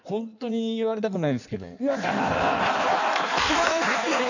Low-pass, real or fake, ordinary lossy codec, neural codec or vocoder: 7.2 kHz; fake; none; codec, 16 kHz in and 24 kHz out, 1.1 kbps, FireRedTTS-2 codec